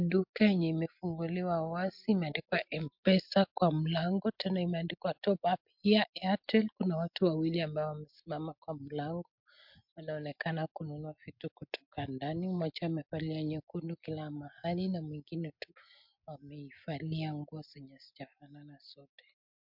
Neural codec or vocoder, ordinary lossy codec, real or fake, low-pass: none; MP3, 48 kbps; real; 5.4 kHz